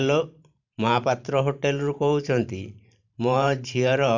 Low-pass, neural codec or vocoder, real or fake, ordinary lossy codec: 7.2 kHz; vocoder, 44.1 kHz, 128 mel bands every 512 samples, BigVGAN v2; fake; none